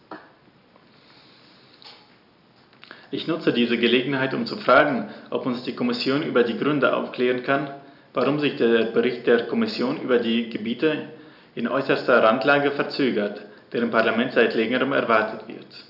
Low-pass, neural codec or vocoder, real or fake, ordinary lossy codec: 5.4 kHz; none; real; none